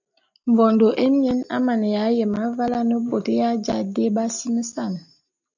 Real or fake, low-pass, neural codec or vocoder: real; 7.2 kHz; none